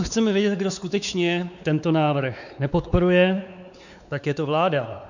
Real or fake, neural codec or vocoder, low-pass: fake; codec, 16 kHz, 4 kbps, X-Codec, WavLM features, trained on Multilingual LibriSpeech; 7.2 kHz